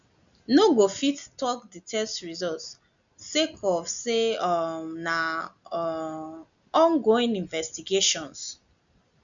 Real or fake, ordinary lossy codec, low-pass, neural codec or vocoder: real; none; 7.2 kHz; none